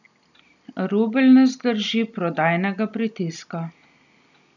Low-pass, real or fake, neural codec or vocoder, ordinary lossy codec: 7.2 kHz; real; none; none